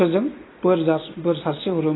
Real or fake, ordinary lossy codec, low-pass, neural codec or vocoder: real; AAC, 16 kbps; 7.2 kHz; none